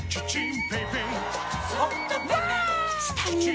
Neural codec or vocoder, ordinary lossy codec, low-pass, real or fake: none; none; none; real